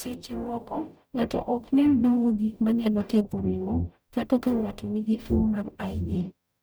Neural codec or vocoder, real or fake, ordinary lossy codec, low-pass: codec, 44.1 kHz, 0.9 kbps, DAC; fake; none; none